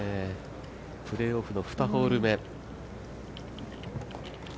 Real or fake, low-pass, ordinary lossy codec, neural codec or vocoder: real; none; none; none